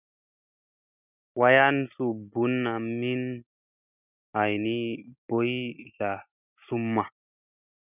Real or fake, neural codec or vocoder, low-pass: real; none; 3.6 kHz